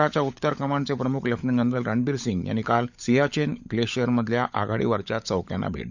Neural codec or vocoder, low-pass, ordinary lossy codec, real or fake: codec, 16 kHz, 16 kbps, FunCodec, trained on LibriTTS, 50 frames a second; 7.2 kHz; none; fake